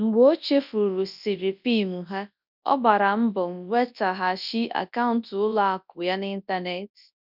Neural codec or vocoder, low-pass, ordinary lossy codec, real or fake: codec, 24 kHz, 0.9 kbps, WavTokenizer, large speech release; 5.4 kHz; none; fake